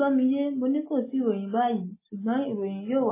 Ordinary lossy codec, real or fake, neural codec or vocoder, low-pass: MP3, 16 kbps; real; none; 3.6 kHz